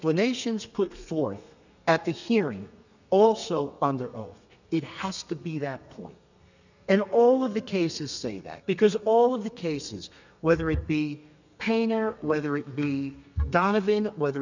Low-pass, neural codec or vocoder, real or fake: 7.2 kHz; codec, 32 kHz, 1.9 kbps, SNAC; fake